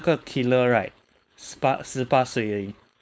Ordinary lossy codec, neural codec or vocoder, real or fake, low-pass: none; codec, 16 kHz, 4.8 kbps, FACodec; fake; none